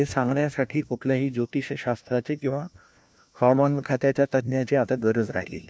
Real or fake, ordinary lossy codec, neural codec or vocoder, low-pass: fake; none; codec, 16 kHz, 1 kbps, FunCodec, trained on LibriTTS, 50 frames a second; none